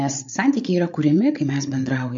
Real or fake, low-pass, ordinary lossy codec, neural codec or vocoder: fake; 7.2 kHz; MP3, 48 kbps; codec, 16 kHz, 8 kbps, FreqCodec, larger model